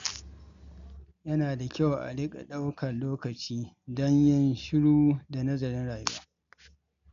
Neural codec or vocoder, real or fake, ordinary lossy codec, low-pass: none; real; MP3, 64 kbps; 7.2 kHz